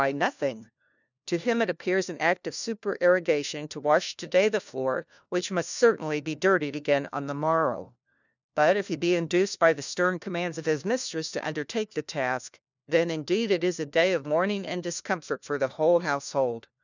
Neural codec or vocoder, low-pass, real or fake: codec, 16 kHz, 1 kbps, FunCodec, trained on LibriTTS, 50 frames a second; 7.2 kHz; fake